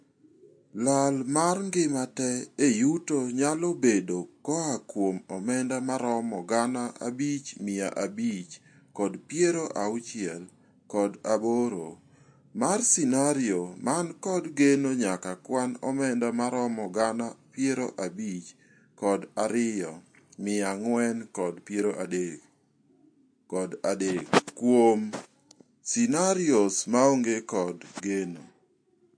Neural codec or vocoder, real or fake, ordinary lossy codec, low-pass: none; real; MP3, 48 kbps; 9.9 kHz